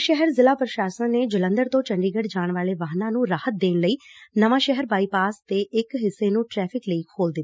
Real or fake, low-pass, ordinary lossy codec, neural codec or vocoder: real; none; none; none